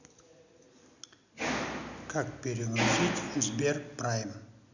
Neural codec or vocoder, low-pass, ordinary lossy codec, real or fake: none; 7.2 kHz; none; real